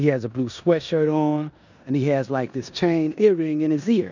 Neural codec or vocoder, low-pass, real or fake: codec, 16 kHz in and 24 kHz out, 0.9 kbps, LongCat-Audio-Codec, four codebook decoder; 7.2 kHz; fake